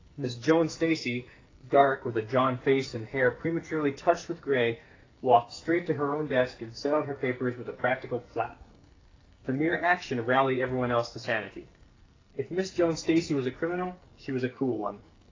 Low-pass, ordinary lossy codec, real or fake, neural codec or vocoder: 7.2 kHz; AAC, 32 kbps; fake; codec, 44.1 kHz, 2.6 kbps, SNAC